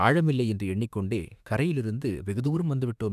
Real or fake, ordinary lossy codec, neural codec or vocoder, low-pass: fake; none; autoencoder, 48 kHz, 32 numbers a frame, DAC-VAE, trained on Japanese speech; 14.4 kHz